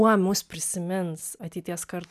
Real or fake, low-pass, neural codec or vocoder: real; 14.4 kHz; none